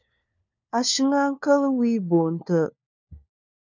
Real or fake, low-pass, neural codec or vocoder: fake; 7.2 kHz; codec, 16 kHz, 4 kbps, FunCodec, trained on LibriTTS, 50 frames a second